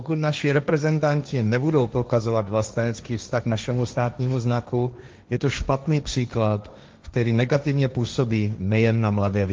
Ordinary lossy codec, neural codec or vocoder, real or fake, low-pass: Opus, 32 kbps; codec, 16 kHz, 1.1 kbps, Voila-Tokenizer; fake; 7.2 kHz